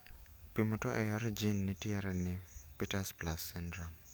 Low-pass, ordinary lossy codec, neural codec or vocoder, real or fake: none; none; codec, 44.1 kHz, 7.8 kbps, DAC; fake